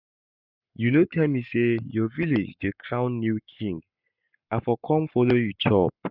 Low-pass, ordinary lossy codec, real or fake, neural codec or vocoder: 5.4 kHz; none; fake; codec, 44.1 kHz, 7.8 kbps, Pupu-Codec